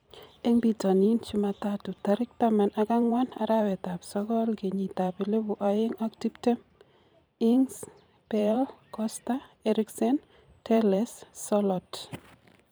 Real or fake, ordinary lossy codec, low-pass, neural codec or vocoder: fake; none; none; vocoder, 44.1 kHz, 128 mel bands every 512 samples, BigVGAN v2